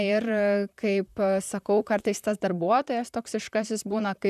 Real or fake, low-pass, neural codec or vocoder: fake; 14.4 kHz; vocoder, 44.1 kHz, 128 mel bands, Pupu-Vocoder